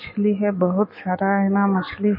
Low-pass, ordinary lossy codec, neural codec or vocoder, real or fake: 5.4 kHz; MP3, 24 kbps; autoencoder, 48 kHz, 128 numbers a frame, DAC-VAE, trained on Japanese speech; fake